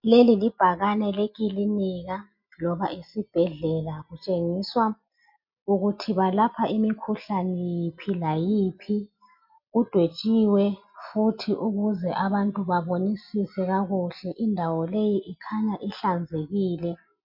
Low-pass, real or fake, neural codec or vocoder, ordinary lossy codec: 5.4 kHz; real; none; MP3, 48 kbps